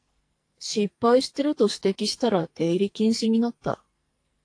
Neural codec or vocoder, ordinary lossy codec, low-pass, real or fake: codec, 44.1 kHz, 2.6 kbps, SNAC; AAC, 48 kbps; 9.9 kHz; fake